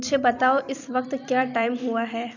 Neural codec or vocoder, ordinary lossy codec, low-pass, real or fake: vocoder, 44.1 kHz, 128 mel bands every 256 samples, BigVGAN v2; none; 7.2 kHz; fake